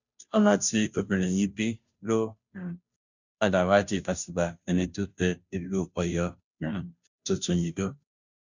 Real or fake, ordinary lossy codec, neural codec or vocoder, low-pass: fake; none; codec, 16 kHz, 0.5 kbps, FunCodec, trained on Chinese and English, 25 frames a second; 7.2 kHz